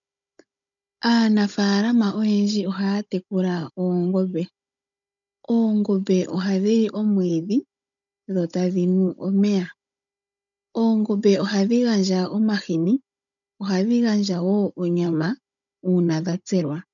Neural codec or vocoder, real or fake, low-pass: codec, 16 kHz, 16 kbps, FunCodec, trained on Chinese and English, 50 frames a second; fake; 7.2 kHz